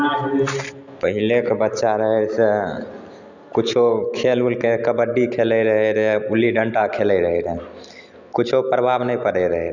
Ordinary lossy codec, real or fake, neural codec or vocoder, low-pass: none; real; none; 7.2 kHz